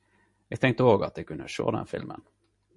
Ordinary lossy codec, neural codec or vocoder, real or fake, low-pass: MP3, 48 kbps; none; real; 10.8 kHz